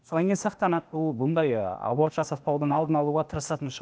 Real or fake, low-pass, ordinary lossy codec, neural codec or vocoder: fake; none; none; codec, 16 kHz, 0.8 kbps, ZipCodec